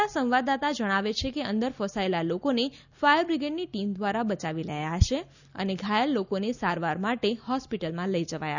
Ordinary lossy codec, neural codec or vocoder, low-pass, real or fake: none; none; 7.2 kHz; real